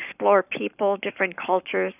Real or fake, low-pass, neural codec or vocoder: fake; 3.6 kHz; codec, 44.1 kHz, 7.8 kbps, Pupu-Codec